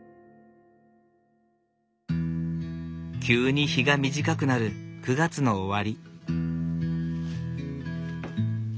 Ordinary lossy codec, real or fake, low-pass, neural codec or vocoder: none; real; none; none